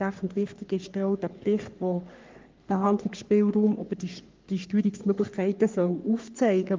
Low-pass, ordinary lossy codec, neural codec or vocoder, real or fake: 7.2 kHz; Opus, 32 kbps; codec, 44.1 kHz, 3.4 kbps, Pupu-Codec; fake